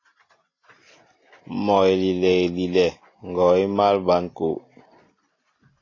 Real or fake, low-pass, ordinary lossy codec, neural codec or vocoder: real; 7.2 kHz; AAC, 32 kbps; none